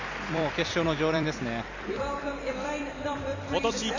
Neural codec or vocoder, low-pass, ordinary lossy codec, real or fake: vocoder, 44.1 kHz, 128 mel bands every 256 samples, BigVGAN v2; 7.2 kHz; none; fake